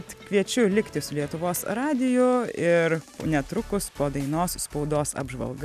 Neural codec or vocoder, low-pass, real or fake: none; 14.4 kHz; real